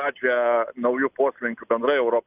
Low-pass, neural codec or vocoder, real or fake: 3.6 kHz; none; real